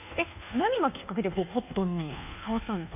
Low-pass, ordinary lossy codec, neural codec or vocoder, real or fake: 3.6 kHz; none; codec, 24 kHz, 1.2 kbps, DualCodec; fake